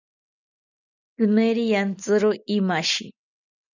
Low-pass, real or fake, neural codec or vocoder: 7.2 kHz; real; none